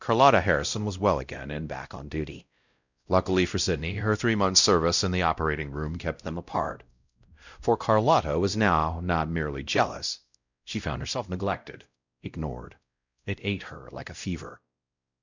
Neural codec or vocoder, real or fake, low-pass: codec, 16 kHz, 0.5 kbps, X-Codec, WavLM features, trained on Multilingual LibriSpeech; fake; 7.2 kHz